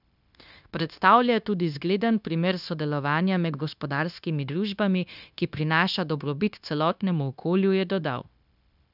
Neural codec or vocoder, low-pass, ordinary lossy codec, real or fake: codec, 16 kHz, 0.9 kbps, LongCat-Audio-Codec; 5.4 kHz; none; fake